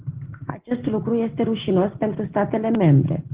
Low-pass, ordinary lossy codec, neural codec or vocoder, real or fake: 3.6 kHz; Opus, 16 kbps; none; real